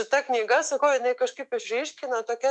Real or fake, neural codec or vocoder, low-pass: fake; autoencoder, 48 kHz, 128 numbers a frame, DAC-VAE, trained on Japanese speech; 10.8 kHz